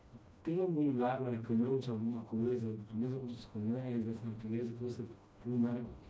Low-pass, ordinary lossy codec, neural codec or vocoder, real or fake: none; none; codec, 16 kHz, 1 kbps, FreqCodec, smaller model; fake